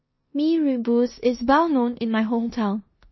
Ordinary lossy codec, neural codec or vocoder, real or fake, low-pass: MP3, 24 kbps; codec, 16 kHz in and 24 kHz out, 0.9 kbps, LongCat-Audio-Codec, four codebook decoder; fake; 7.2 kHz